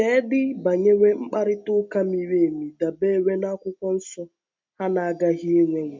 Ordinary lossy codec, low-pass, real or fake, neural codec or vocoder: MP3, 64 kbps; 7.2 kHz; real; none